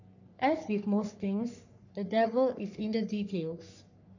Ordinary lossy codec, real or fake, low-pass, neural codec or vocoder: none; fake; 7.2 kHz; codec, 44.1 kHz, 3.4 kbps, Pupu-Codec